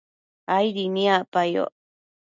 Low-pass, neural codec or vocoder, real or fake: 7.2 kHz; none; real